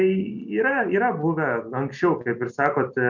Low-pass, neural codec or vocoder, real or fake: 7.2 kHz; none; real